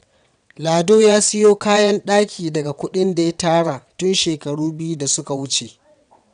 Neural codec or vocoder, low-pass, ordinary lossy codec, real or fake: vocoder, 22.05 kHz, 80 mel bands, WaveNeXt; 9.9 kHz; none; fake